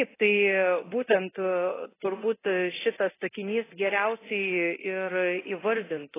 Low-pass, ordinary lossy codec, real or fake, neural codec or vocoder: 3.6 kHz; AAC, 16 kbps; fake; codec, 24 kHz, 0.9 kbps, DualCodec